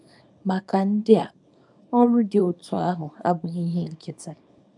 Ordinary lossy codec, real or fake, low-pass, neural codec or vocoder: none; fake; 10.8 kHz; codec, 24 kHz, 0.9 kbps, WavTokenizer, small release